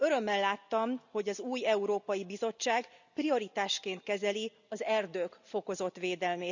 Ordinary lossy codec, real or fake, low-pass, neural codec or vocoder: none; real; 7.2 kHz; none